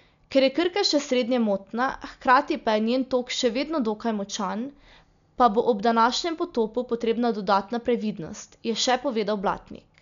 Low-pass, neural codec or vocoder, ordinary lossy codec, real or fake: 7.2 kHz; none; none; real